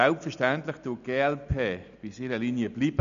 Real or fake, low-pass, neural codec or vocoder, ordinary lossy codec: real; 7.2 kHz; none; none